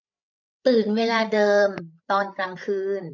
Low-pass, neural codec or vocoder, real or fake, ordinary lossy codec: 7.2 kHz; codec, 16 kHz, 8 kbps, FreqCodec, larger model; fake; none